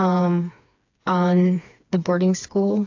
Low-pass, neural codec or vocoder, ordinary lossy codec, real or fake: 7.2 kHz; codec, 16 kHz, 4 kbps, FreqCodec, smaller model; AAC, 48 kbps; fake